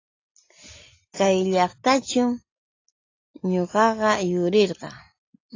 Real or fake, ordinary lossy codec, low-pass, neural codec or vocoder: real; AAC, 32 kbps; 7.2 kHz; none